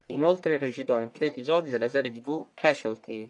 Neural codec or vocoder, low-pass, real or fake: codec, 44.1 kHz, 1.7 kbps, Pupu-Codec; 10.8 kHz; fake